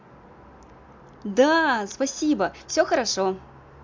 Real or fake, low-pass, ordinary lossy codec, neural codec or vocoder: real; 7.2 kHz; MP3, 64 kbps; none